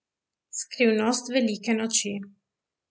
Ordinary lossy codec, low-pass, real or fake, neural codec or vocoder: none; none; real; none